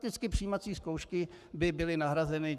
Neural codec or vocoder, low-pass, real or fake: codec, 44.1 kHz, 7.8 kbps, Pupu-Codec; 14.4 kHz; fake